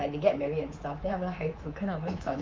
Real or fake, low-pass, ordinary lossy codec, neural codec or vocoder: fake; 7.2 kHz; Opus, 32 kbps; codec, 24 kHz, 3.1 kbps, DualCodec